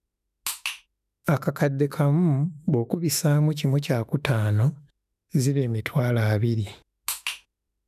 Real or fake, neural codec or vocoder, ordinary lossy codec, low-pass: fake; autoencoder, 48 kHz, 32 numbers a frame, DAC-VAE, trained on Japanese speech; none; 14.4 kHz